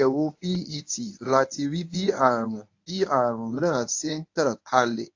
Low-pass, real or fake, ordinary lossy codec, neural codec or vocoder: 7.2 kHz; fake; AAC, 48 kbps; codec, 24 kHz, 0.9 kbps, WavTokenizer, medium speech release version 1